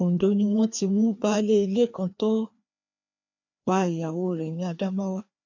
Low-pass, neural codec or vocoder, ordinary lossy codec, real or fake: 7.2 kHz; codec, 16 kHz, 2 kbps, FreqCodec, larger model; none; fake